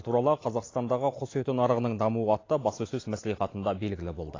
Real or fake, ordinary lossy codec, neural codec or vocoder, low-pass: real; AAC, 32 kbps; none; 7.2 kHz